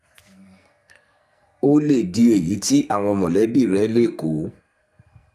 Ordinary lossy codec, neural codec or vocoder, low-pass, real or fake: none; codec, 44.1 kHz, 2.6 kbps, SNAC; 14.4 kHz; fake